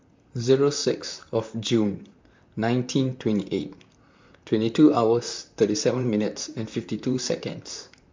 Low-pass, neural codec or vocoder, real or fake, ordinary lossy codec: 7.2 kHz; vocoder, 44.1 kHz, 128 mel bands, Pupu-Vocoder; fake; MP3, 64 kbps